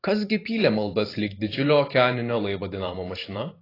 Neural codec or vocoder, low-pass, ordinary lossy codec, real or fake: vocoder, 44.1 kHz, 128 mel bands every 512 samples, BigVGAN v2; 5.4 kHz; AAC, 24 kbps; fake